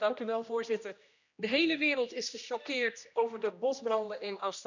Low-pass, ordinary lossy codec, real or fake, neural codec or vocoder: 7.2 kHz; none; fake; codec, 16 kHz, 1 kbps, X-Codec, HuBERT features, trained on general audio